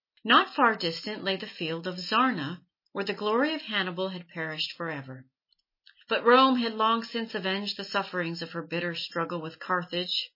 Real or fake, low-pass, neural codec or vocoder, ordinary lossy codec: real; 5.4 kHz; none; MP3, 24 kbps